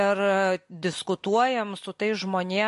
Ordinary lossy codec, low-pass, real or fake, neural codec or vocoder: MP3, 48 kbps; 14.4 kHz; real; none